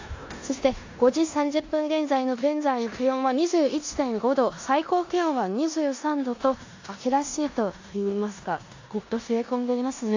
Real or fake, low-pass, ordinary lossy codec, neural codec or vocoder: fake; 7.2 kHz; AAC, 48 kbps; codec, 16 kHz in and 24 kHz out, 0.9 kbps, LongCat-Audio-Codec, four codebook decoder